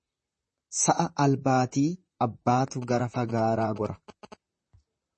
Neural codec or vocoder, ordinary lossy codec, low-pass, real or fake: vocoder, 44.1 kHz, 128 mel bands, Pupu-Vocoder; MP3, 32 kbps; 10.8 kHz; fake